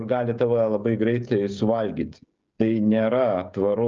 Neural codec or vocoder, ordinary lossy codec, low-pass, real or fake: codec, 16 kHz, 16 kbps, FreqCodec, smaller model; Opus, 24 kbps; 7.2 kHz; fake